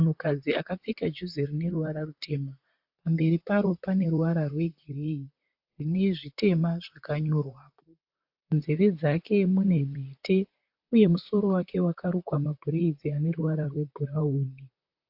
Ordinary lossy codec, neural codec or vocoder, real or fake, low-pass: AAC, 48 kbps; vocoder, 44.1 kHz, 128 mel bands, Pupu-Vocoder; fake; 5.4 kHz